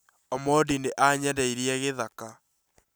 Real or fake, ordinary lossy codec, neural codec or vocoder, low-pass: real; none; none; none